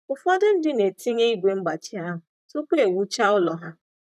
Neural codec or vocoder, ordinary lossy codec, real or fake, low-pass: vocoder, 44.1 kHz, 128 mel bands, Pupu-Vocoder; none; fake; 14.4 kHz